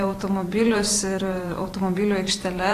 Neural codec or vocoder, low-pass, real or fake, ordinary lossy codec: vocoder, 44.1 kHz, 128 mel bands every 512 samples, BigVGAN v2; 14.4 kHz; fake; AAC, 48 kbps